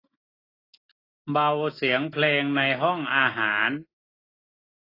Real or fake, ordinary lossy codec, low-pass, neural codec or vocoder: real; AAC, 24 kbps; 5.4 kHz; none